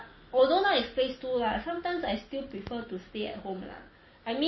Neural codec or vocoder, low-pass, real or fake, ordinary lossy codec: none; 7.2 kHz; real; MP3, 24 kbps